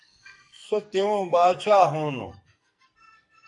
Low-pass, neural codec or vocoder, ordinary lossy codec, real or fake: 10.8 kHz; codec, 44.1 kHz, 2.6 kbps, SNAC; AAC, 64 kbps; fake